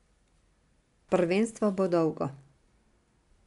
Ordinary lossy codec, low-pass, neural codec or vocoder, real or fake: none; 10.8 kHz; none; real